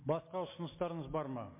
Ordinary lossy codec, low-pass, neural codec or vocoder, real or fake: MP3, 24 kbps; 3.6 kHz; none; real